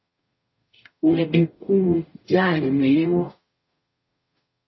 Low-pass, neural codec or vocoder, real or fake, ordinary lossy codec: 7.2 kHz; codec, 44.1 kHz, 0.9 kbps, DAC; fake; MP3, 24 kbps